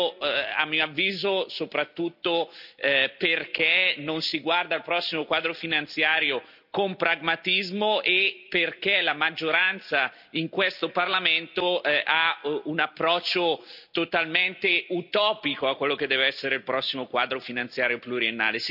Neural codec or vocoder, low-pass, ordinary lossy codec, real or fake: none; 5.4 kHz; none; real